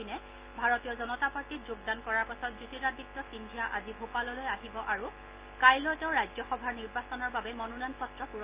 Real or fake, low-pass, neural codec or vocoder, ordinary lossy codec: real; 3.6 kHz; none; Opus, 32 kbps